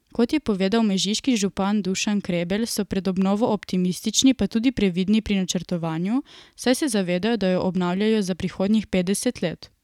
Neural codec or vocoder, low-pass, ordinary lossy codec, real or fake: none; 19.8 kHz; none; real